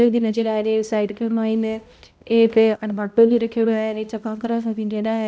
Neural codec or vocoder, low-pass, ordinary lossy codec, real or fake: codec, 16 kHz, 0.5 kbps, X-Codec, HuBERT features, trained on balanced general audio; none; none; fake